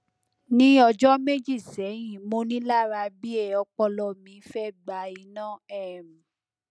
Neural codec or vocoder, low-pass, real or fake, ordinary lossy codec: none; none; real; none